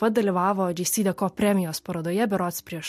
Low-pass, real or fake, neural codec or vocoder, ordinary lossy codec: 14.4 kHz; real; none; MP3, 64 kbps